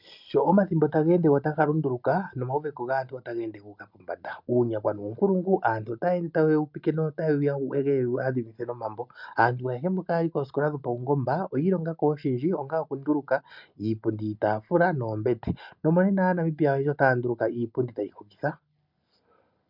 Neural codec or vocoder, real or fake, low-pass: none; real; 5.4 kHz